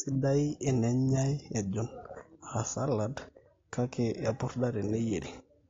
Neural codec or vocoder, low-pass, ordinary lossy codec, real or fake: none; 7.2 kHz; AAC, 32 kbps; real